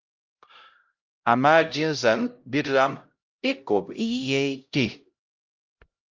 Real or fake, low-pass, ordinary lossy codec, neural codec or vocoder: fake; 7.2 kHz; Opus, 32 kbps; codec, 16 kHz, 0.5 kbps, X-Codec, HuBERT features, trained on LibriSpeech